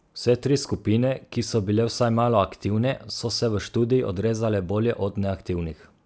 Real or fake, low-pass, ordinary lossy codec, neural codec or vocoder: real; none; none; none